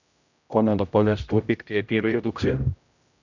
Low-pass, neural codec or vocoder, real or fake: 7.2 kHz; codec, 16 kHz, 0.5 kbps, X-Codec, HuBERT features, trained on general audio; fake